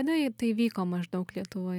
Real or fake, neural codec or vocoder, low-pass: real; none; 19.8 kHz